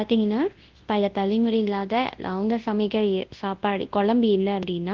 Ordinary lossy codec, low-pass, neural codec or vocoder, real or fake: Opus, 16 kbps; 7.2 kHz; codec, 24 kHz, 0.9 kbps, WavTokenizer, large speech release; fake